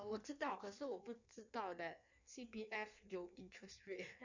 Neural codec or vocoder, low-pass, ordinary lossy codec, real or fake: codec, 16 kHz in and 24 kHz out, 1.1 kbps, FireRedTTS-2 codec; 7.2 kHz; none; fake